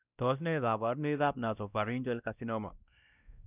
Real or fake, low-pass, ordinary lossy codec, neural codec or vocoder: fake; 3.6 kHz; none; codec, 16 kHz, 1 kbps, X-Codec, WavLM features, trained on Multilingual LibriSpeech